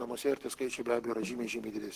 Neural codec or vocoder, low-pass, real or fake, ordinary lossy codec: codec, 44.1 kHz, 7.8 kbps, Pupu-Codec; 14.4 kHz; fake; Opus, 16 kbps